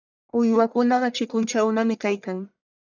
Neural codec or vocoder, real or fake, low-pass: codec, 44.1 kHz, 1.7 kbps, Pupu-Codec; fake; 7.2 kHz